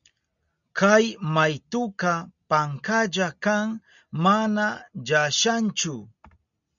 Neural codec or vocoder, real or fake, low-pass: none; real; 7.2 kHz